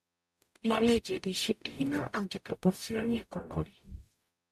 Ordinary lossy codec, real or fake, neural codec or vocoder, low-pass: MP3, 96 kbps; fake; codec, 44.1 kHz, 0.9 kbps, DAC; 14.4 kHz